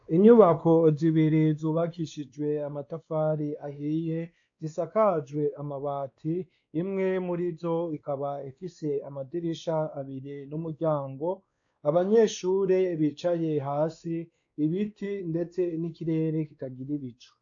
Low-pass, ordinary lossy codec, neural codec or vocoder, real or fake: 7.2 kHz; AAC, 64 kbps; codec, 16 kHz, 2 kbps, X-Codec, WavLM features, trained on Multilingual LibriSpeech; fake